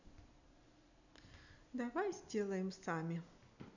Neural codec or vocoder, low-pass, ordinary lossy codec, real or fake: none; 7.2 kHz; none; real